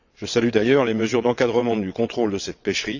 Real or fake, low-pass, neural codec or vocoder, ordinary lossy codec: fake; 7.2 kHz; vocoder, 22.05 kHz, 80 mel bands, WaveNeXt; none